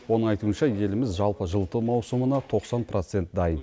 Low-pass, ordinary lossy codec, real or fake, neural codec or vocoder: none; none; real; none